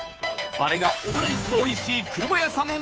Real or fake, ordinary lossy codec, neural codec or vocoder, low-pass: fake; none; codec, 16 kHz, 2 kbps, FunCodec, trained on Chinese and English, 25 frames a second; none